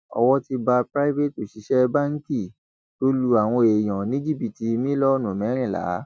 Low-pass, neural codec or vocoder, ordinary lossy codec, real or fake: none; none; none; real